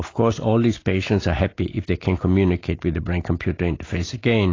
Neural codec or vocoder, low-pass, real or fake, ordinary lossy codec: none; 7.2 kHz; real; AAC, 32 kbps